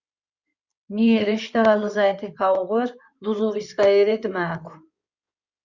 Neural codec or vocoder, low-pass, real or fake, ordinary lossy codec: codec, 16 kHz in and 24 kHz out, 2.2 kbps, FireRedTTS-2 codec; 7.2 kHz; fake; Opus, 64 kbps